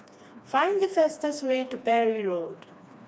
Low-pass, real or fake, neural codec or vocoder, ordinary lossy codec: none; fake; codec, 16 kHz, 2 kbps, FreqCodec, smaller model; none